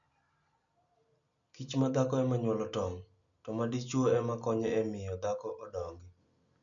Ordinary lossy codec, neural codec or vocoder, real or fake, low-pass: none; none; real; 7.2 kHz